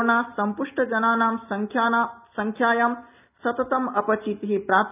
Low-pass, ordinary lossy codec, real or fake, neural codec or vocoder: 3.6 kHz; none; real; none